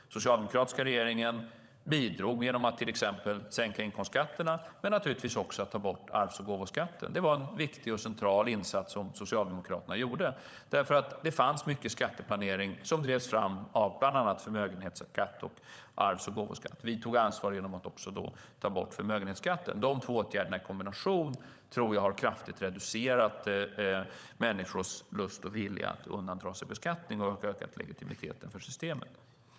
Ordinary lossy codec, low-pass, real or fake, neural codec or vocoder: none; none; fake; codec, 16 kHz, 16 kbps, FunCodec, trained on LibriTTS, 50 frames a second